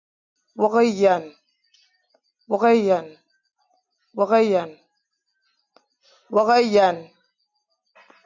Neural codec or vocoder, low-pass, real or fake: none; 7.2 kHz; real